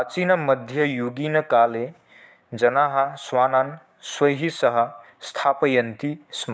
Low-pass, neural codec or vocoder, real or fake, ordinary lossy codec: none; codec, 16 kHz, 6 kbps, DAC; fake; none